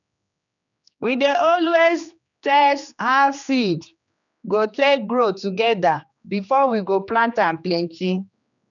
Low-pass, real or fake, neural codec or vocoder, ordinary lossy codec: 7.2 kHz; fake; codec, 16 kHz, 2 kbps, X-Codec, HuBERT features, trained on general audio; none